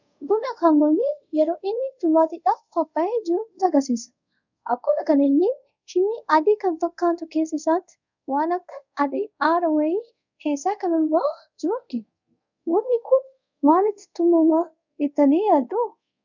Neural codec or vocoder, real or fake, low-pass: codec, 24 kHz, 0.5 kbps, DualCodec; fake; 7.2 kHz